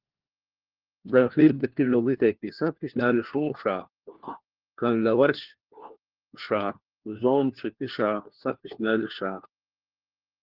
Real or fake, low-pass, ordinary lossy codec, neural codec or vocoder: fake; 5.4 kHz; Opus, 16 kbps; codec, 16 kHz, 1 kbps, FunCodec, trained on LibriTTS, 50 frames a second